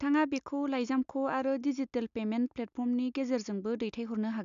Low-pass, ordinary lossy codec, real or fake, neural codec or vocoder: 7.2 kHz; AAC, 96 kbps; real; none